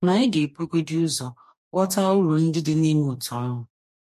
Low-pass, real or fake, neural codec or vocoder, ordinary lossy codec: 14.4 kHz; fake; codec, 44.1 kHz, 2.6 kbps, DAC; MP3, 64 kbps